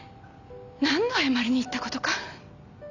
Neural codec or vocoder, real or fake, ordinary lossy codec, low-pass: none; real; none; 7.2 kHz